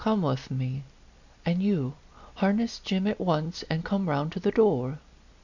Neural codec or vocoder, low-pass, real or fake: none; 7.2 kHz; real